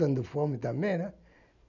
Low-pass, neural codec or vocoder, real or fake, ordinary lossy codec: 7.2 kHz; none; real; none